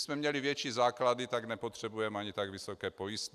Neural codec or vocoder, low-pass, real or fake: none; 14.4 kHz; real